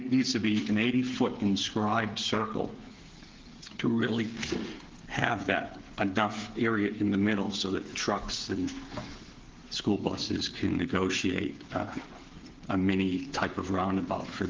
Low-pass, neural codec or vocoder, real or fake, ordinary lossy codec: 7.2 kHz; codec, 16 kHz, 8 kbps, FreqCodec, smaller model; fake; Opus, 16 kbps